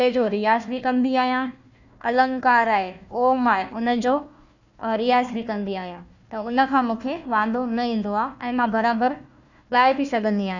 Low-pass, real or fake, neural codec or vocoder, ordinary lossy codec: 7.2 kHz; fake; codec, 16 kHz, 1 kbps, FunCodec, trained on Chinese and English, 50 frames a second; none